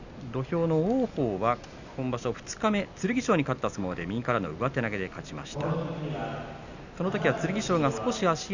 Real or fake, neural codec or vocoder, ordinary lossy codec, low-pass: real; none; none; 7.2 kHz